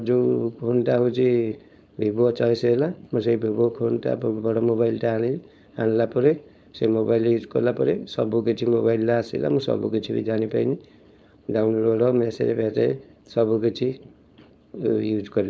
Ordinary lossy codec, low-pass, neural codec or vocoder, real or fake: none; none; codec, 16 kHz, 4.8 kbps, FACodec; fake